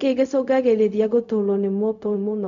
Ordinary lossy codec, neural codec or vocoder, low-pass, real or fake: none; codec, 16 kHz, 0.4 kbps, LongCat-Audio-Codec; 7.2 kHz; fake